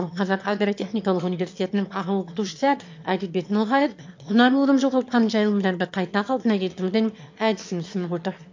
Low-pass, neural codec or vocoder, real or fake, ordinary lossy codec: 7.2 kHz; autoencoder, 22.05 kHz, a latent of 192 numbers a frame, VITS, trained on one speaker; fake; MP3, 48 kbps